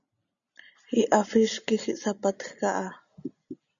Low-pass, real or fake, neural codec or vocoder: 7.2 kHz; real; none